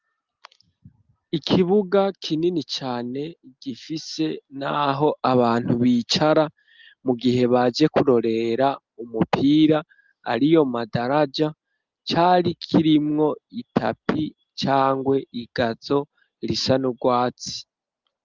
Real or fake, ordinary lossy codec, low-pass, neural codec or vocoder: real; Opus, 32 kbps; 7.2 kHz; none